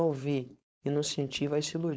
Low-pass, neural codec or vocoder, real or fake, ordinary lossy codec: none; codec, 16 kHz, 4.8 kbps, FACodec; fake; none